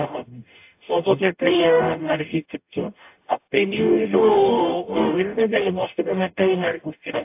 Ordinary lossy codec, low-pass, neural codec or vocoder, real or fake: AAC, 32 kbps; 3.6 kHz; codec, 44.1 kHz, 0.9 kbps, DAC; fake